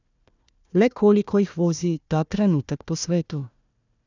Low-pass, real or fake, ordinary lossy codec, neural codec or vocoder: 7.2 kHz; fake; none; codec, 16 kHz, 1 kbps, FunCodec, trained on Chinese and English, 50 frames a second